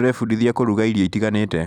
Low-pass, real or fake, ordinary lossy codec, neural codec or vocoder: 19.8 kHz; fake; none; vocoder, 44.1 kHz, 128 mel bands every 256 samples, BigVGAN v2